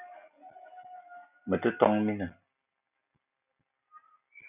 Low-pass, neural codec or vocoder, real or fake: 3.6 kHz; none; real